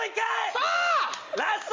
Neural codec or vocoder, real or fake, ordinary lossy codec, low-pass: none; real; Opus, 32 kbps; 7.2 kHz